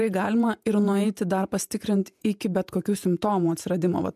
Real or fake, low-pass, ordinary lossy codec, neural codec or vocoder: fake; 14.4 kHz; MP3, 96 kbps; vocoder, 48 kHz, 128 mel bands, Vocos